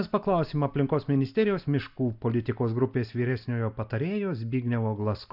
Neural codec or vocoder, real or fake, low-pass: none; real; 5.4 kHz